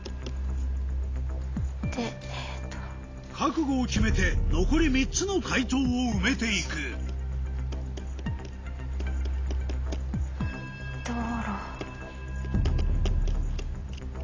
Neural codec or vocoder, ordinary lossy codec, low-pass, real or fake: none; AAC, 32 kbps; 7.2 kHz; real